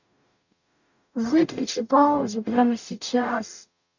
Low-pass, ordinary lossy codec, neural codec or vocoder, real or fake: 7.2 kHz; none; codec, 44.1 kHz, 0.9 kbps, DAC; fake